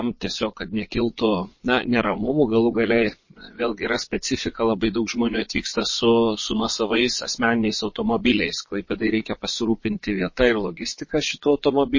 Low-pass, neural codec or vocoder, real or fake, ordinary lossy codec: 7.2 kHz; vocoder, 22.05 kHz, 80 mel bands, Vocos; fake; MP3, 32 kbps